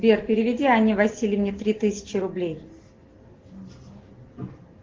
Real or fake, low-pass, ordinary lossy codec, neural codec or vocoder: real; 7.2 kHz; Opus, 16 kbps; none